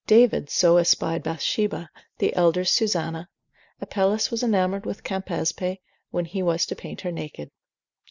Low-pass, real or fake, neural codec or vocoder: 7.2 kHz; real; none